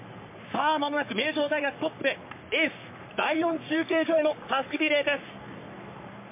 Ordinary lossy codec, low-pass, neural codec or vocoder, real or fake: MP3, 24 kbps; 3.6 kHz; codec, 44.1 kHz, 3.4 kbps, Pupu-Codec; fake